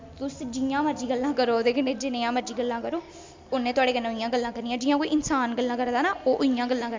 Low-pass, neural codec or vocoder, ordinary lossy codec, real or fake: 7.2 kHz; none; MP3, 64 kbps; real